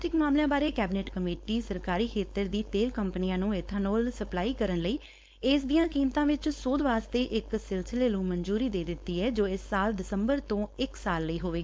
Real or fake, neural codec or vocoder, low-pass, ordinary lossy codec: fake; codec, 16 kHz, 4.8 kbps, FACodec; none; none